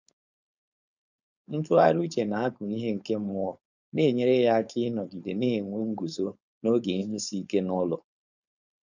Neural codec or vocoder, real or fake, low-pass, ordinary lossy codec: codec, 16 kHz, 4.8 kbps, FACodec; fake; 7.2 kHz; none